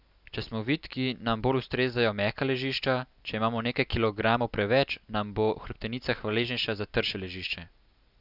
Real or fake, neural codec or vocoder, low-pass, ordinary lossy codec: real; none; 5.4 kHz; none